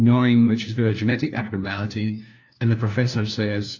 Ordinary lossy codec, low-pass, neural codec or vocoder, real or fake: AAC, 48 kbps; 7.2 kHz; codec, 16 kHz, 1 kbps, FunCodec, trained on LibriTTS, 50 frames a second; fake